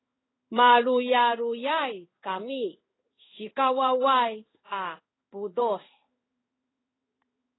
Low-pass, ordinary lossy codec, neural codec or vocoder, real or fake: 7.2 kHz; AAC, 16 kbps; codec, 16 kHz in and 24 kHz out, 1 kbps, XY-Tokenizer; fake